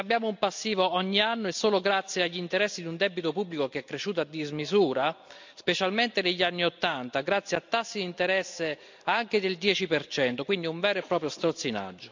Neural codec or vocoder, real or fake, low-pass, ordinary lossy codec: none; real; 7.2 kHz; MP3, 64 kbps